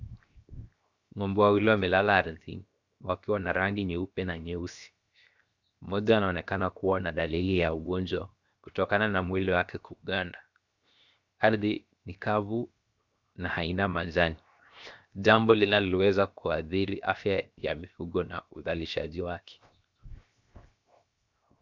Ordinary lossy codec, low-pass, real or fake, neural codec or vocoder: Opus, 64 kbps; 7.2 kHz; fake; codec, 16 kHz, 0.7 kbps, FocalCodec